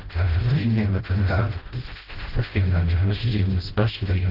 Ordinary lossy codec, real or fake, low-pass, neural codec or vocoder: Opus, 16 kbps; fake; 5.4 kHz; codec, 16 kHz, 0.5 kbps, FreqCodec, smaller model